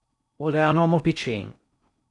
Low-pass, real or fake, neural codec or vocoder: 10.8 kHz; fake; codec, 16 kHz in and 24 kHz out, 0.6 kbps, FocalCodec, streaming, 4096 codes